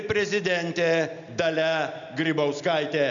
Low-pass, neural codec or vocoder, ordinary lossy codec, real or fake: 7.2 kHz; none; MP3, 96 kbps; real